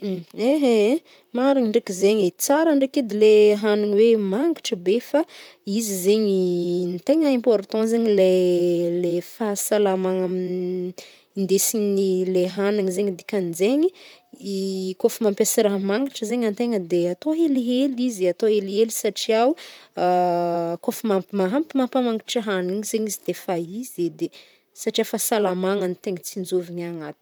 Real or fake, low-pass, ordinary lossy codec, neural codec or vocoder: fake; none; none; vocoder, 44.1 kHz, 128 mel bands, Pupu-Vocoder